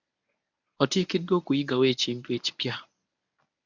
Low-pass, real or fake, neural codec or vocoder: 7.2 kHz; fake; codec, 24 kHz, 0.9 kbps, WavTokenizer, medium speech release version 1